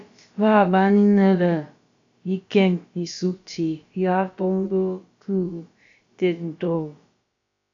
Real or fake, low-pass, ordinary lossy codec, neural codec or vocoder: fake; 7.2 kHz; MP3, 64 kbps; codec, 16 kHz, about 1 kbps, DyCAST, with the encoder's durations